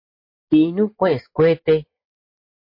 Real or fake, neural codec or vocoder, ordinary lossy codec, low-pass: real; none; MP3, 32 kbps; 5.4 kHz